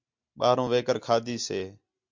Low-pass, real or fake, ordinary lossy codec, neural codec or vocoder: 7.2 kHz; real; MP3, 64 kbps; none